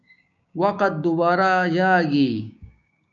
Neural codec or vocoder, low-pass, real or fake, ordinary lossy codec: codec, 16 kHz, 6 kbps, DAC; 7.2 kHz; fake; MP3, 96 kbps